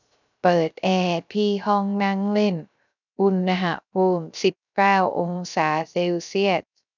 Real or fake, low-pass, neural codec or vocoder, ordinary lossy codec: fake; 7.2 kHz; codec, 16 kHz, 0.3 kbps, FocalCodec; none